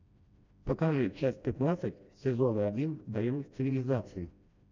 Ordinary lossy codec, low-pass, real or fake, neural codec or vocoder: MP3, 64 kbps; 7.2 kHz; fake; codec, 16 kHz, 1 kbps, FreqCodec, smaller model